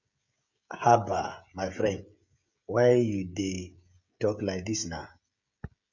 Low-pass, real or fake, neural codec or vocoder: 7.2 kHz; fake; codec, 16 kHz, 16 kbps, FreqCodec, smaller model